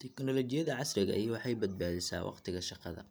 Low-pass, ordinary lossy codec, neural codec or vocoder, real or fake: none; none; none; real